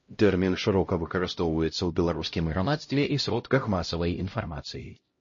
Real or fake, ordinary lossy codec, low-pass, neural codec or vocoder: fake; MP3, 32 kbps; 7.2 kHz; codec, 16 kHz, 0.5 kbps, X-Codec, HuBERT features, trained on LibriSpeech